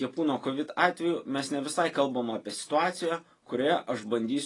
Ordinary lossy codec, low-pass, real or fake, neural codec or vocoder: AAC, 32 kbps; 10.8 kHz; real; none